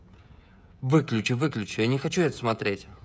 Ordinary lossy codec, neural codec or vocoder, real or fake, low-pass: none; codec, 16 kHz, 16 kbps, FreqCodec, smaller model; fake; none